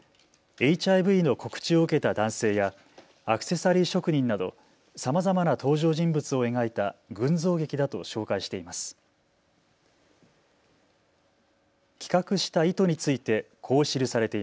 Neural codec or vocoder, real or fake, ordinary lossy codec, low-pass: none; real; none; none